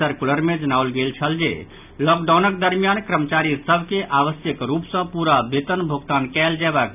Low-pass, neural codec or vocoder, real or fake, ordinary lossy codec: 3.6 kHz; none; real; none